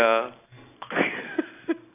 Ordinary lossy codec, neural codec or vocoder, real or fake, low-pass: AAC, 16 kbps; none; real; 3.6 kHz